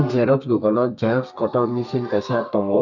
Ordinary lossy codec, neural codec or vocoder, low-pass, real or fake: none; codec, 32 kHz, 1.9 kbps, SNAC; 7.2 kHz; fake